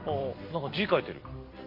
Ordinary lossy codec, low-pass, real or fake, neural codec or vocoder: MP3, 48 kbps; 5.4 kHz; real; none